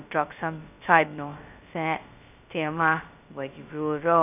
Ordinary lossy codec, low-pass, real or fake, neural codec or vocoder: none; 3.6 kHz; fake; codec, 16 kHz, 0.2 kbps, FocalCodec